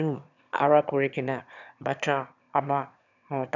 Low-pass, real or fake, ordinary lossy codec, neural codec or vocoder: 7.2 kHz; fake; none; autoencoder, 22.05 kHz, a latent of 192 numbers a frame, VITS, trained on one speaker